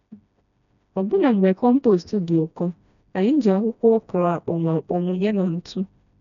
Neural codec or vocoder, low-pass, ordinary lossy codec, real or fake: codec, 16 kHz, 1 kbps, FreqCodec, smaller model; 7.2 kHz; none; fake